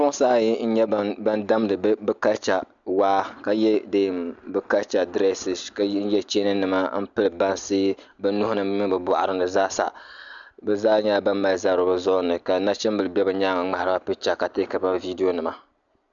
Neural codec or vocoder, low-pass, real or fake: none; 7.2 kHz; real